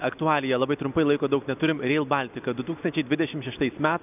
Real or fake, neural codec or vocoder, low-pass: real; none; 3.6 kHz